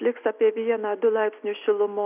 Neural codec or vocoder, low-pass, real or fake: none; 3.6 kHz; real